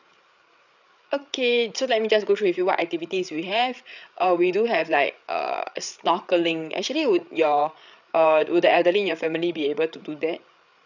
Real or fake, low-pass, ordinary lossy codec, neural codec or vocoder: fake; 7.2 kHz; none; codec, 16 kHz, 8 kbps, FreqCodec, larger model